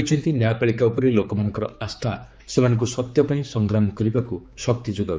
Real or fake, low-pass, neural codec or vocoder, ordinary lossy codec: fake; none; codec, 16 kHz, 4 kbps, X-Codec, HuBERT features, trained on general audio; none